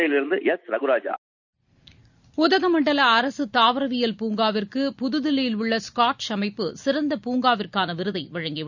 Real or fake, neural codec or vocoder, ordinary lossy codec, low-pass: real; none; none; 7.2 kHz